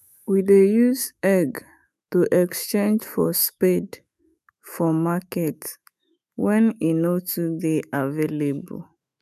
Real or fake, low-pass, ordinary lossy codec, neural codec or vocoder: fake; 14.4 kHz; none; autoencoder, 48 kHz, 128 numbers a frame, DAC-VAE, trained on Japanese speech